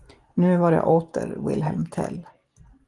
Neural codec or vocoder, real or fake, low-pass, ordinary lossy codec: none; real; 10.8 kHz; Opus, 24 kbps